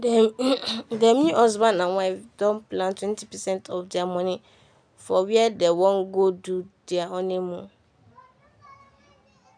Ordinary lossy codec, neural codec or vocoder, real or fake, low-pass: none; none; real; 9.9 kHz